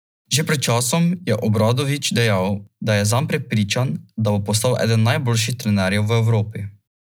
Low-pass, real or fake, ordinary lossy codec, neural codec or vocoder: none; real; none; none